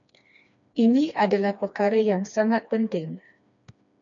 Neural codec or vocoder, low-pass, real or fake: codec, 16 kHz, 2 kbps, FreqCodec, smaller model; 7.2 kHz; fake